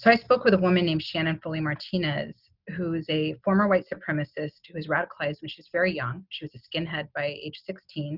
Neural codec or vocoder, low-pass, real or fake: none; 5.4 kHz; real